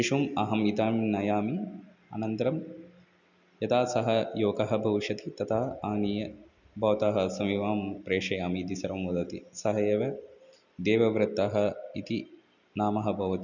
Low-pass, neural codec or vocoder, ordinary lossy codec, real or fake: 7.2 kHz; none; none; real